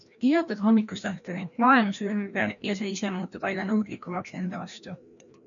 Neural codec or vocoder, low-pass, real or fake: codec, 16 kHz, 1 kbps, FreqCodec, larger model; 7.2 kHz; fake